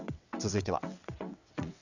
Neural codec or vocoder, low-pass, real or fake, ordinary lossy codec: vocoder, 22.05 kHz, 80 mel bands, WaveNeXt; 7.2 kHz; fake; none